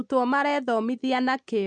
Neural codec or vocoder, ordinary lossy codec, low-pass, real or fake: none; MP3, 64 kbps; 9.9 kHz; real